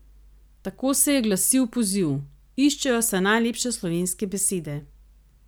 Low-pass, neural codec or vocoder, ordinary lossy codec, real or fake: none; none; none; real